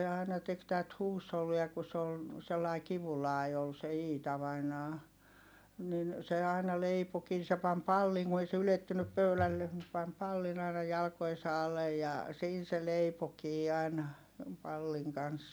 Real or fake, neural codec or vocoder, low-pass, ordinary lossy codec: real; none; none; none